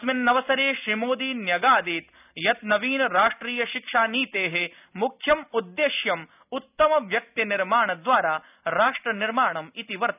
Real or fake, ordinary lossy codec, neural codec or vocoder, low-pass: real; none; none; 3.6 kHz